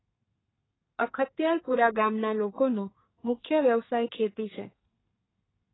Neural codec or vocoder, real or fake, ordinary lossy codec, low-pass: codec, 32 kHz, 1.9 kbps, SNAC; fake; AAC, 16 kbps; 7.2 kHz